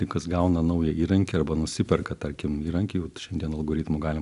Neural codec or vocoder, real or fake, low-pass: none; real; 10.8 kHz